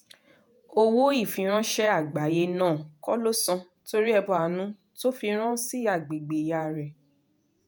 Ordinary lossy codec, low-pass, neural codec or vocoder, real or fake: none; none; vocoder, 48 kHz, 128 mel bands, Vocos; fake